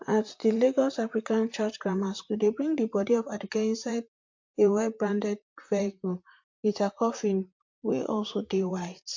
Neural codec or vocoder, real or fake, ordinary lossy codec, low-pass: vocoder, 44.1 kHz, 128 mel bands, Pupu-Vocoder; fake; MP3, 48 kbps; 7.2 kHz